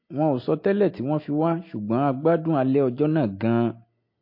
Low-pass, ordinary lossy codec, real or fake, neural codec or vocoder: 5.4 kHz; MP3, 32 kbps; real; none